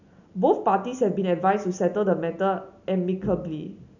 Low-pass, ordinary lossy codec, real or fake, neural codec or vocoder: 7.2 kHz; none; real; none